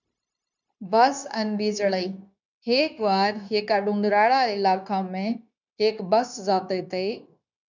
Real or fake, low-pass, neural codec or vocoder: fake; 7.2 kHz; codec, 16 kHz, 0.9 kbps, LongCat-Audio-Codec